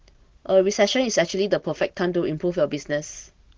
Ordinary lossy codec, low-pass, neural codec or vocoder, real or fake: Opus, 16 kbps; 7.2 kHz; none; real